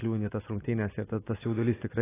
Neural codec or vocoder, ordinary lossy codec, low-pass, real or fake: none; AAC, 16 kbps; 3.6 kHz; real